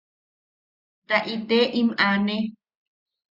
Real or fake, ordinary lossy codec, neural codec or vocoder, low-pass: real; Opus, 64 kbps; none; 5.4 kHz